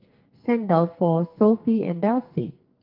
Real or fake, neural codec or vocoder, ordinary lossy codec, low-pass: fake; codec, 44.1 kHz, 2.6 kbps, SNAC; Opus, 32 kbps; 5.4 kHz